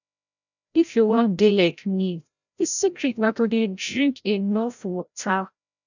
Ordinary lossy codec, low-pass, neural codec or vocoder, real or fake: none; 7.2 kHz; codec, 16 kHz, 0.5 kbps, FreqCodec, larger model; fake